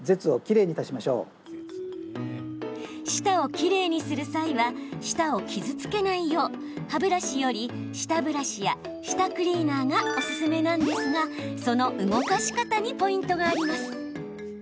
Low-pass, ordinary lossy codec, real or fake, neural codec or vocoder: none; none; real; none